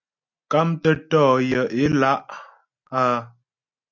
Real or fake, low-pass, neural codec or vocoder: real; 7.2 kHz; none